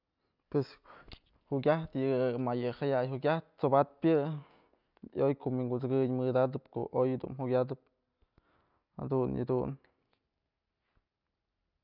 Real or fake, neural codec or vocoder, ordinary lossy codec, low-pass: real; none; none; 5.4 kHz